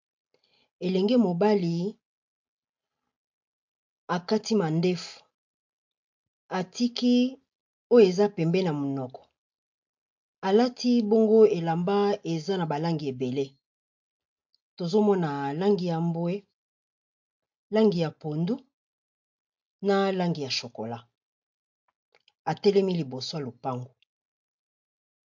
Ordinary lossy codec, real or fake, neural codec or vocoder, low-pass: MP3, 48 kbps; real; none; 7.2 kHz